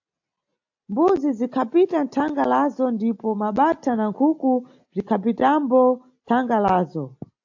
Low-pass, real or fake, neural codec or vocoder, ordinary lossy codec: 7.2 kHz; real; none; AAC, 48 kbps